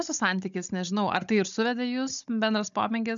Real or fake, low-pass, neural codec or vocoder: fake; 7.2 kHz; codec, 16 kHz, 16 kbps, FunCodec, trained on Chinese and English, 50 frames a second